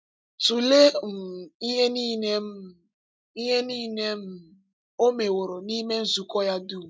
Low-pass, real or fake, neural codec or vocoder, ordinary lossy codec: none; real; none; none